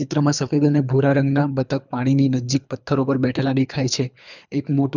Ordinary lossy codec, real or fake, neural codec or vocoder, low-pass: none; fake; codec, 24 kHz, 3 kbps, HILCodec; 7.2 kHz